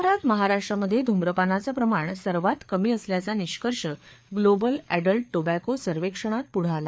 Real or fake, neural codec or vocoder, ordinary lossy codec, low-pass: fake; codec, 16 kHz, 4 kbps, FreqCodec, larger model; none; none